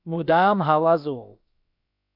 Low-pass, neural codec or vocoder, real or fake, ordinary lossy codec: 5.4 kHz; codec, 16 kHz, about 1 kbps, DyCAST, with the encoder's durations; fake; MP3, 48 kbps